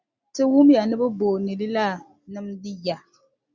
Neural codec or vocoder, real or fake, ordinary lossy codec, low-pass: none; real; Opus, 64 kbps; 7.2 kHz